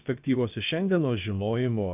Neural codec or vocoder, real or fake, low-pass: codec, 16 kHz, about 1 kbps, DyCAST, with the encoder's durations; fake; 3.6 kHz